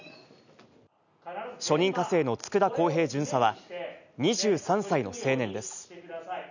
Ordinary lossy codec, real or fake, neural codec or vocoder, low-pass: none; real; none; 7.2 kHz